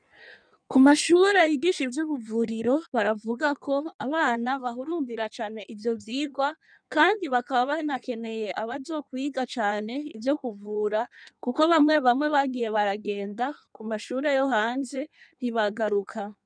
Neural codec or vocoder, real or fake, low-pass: codec, 16 kHz in and 24 kHz out, 1.1 kbps, FireRedTTS-2 codec; fake; 9.9 kHz